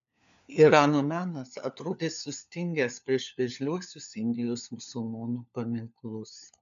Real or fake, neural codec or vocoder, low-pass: fake; codec, 16 kHz, 4 kbps, FunCodec, trained on LibriTTS, 50 frames a second; 7.2 kHz